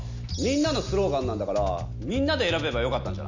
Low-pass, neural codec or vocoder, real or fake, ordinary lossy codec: 7.2 kHz; none; real; none